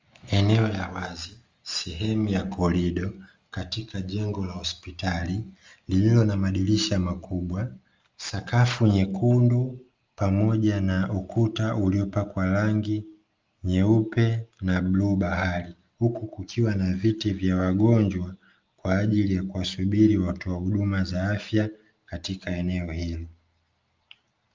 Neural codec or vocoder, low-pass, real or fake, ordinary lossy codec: none; 7.2 kHz; real; Opus, 32 kbps